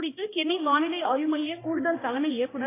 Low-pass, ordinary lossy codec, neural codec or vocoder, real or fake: 3.6 kHz; AAC, 16 kbps; codec, 16 kHz, 1 kbps, X-Codec, HuBERT features, trained on balanced general audio; fake